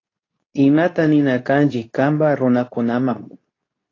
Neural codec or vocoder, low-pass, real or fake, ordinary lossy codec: codec, 24 kHz, 0.9 kbps, WavTokenizer, medium speech release version 2; 7.2 kHz; fake; AAC, 32 kbps